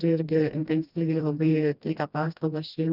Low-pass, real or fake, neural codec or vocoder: 5.4 kHz; fake; codec, 16 kHz, 1 kbps, FreqCodec, smaller model